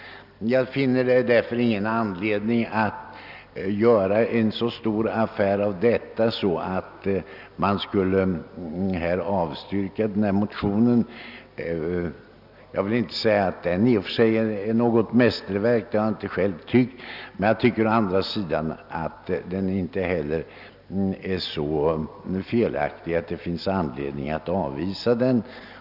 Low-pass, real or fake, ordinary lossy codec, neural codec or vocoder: 5.4 kHz; real; none; none